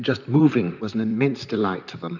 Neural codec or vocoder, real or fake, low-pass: vocoder, 44.1 kHz, 128 mel bands, Pupu-Vocoder; fake; 7.2 kHz